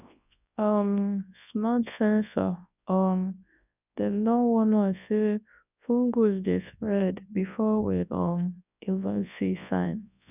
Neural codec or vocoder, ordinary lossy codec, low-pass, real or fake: codec, 24 kHz, 0.9 kbps, WavTokenizer, large speech release; none; 3.6 kHz; fake